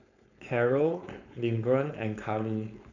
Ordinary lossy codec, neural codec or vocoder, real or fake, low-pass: none; codec, 16 kHz, 4.8 kbps, FACodec; fake; 7.2 kHz